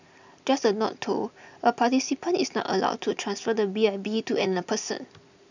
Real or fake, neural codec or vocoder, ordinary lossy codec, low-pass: real; none; none; 7.2 kHz